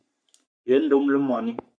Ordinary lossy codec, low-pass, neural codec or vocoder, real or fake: AAC, 64 kbps; 9.9 kHz; codec, 44.1 kHz, 3.4 kbps, Pupu-Codec; fake